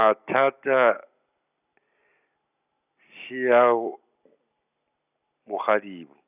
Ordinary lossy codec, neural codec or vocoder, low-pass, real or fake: none; none; 3.6 kHz; real